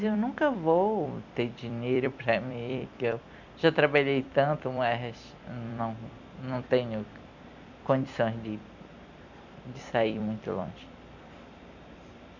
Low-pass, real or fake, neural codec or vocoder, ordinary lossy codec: 7.2 kHz; fake; vocoder, 44.1 kHz, 128 mel bands every 256 samples, BigVGAN v2; none